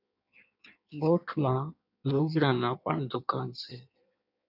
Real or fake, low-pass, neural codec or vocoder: fake; 5.4 kHz; codec, 16 kHz in and 24 kHz out, 1.1 kbps, FireRedTTS-2 codec